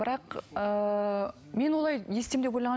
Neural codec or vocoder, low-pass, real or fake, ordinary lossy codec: none; none; real; none